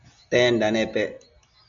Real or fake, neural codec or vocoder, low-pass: real; none; 7.2 kHz